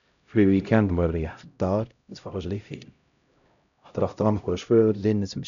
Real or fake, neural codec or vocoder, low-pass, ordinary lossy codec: fake; codec, 16 kHz, 0.5 kbps, X-Codec, HuBERT features, trained on LibriSpeech; 7.2 kHz; none